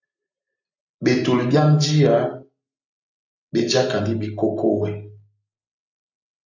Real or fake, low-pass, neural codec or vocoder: real; 7.2 kHz; none